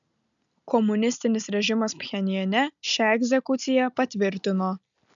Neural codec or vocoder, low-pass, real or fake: none; 7.2 kHz; real